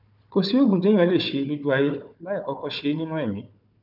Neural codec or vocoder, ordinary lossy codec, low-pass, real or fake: codec, 16 kHz, 4 kbps, FunCodec, trained on Chinese and English, 50 frames a second; none; 5.4 kHz; fake